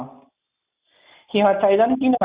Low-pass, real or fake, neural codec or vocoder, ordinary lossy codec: 3.6 kHz; real; none; Opus, 64 kbps